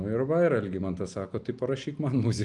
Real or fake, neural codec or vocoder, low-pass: real; none; 10.8 kHz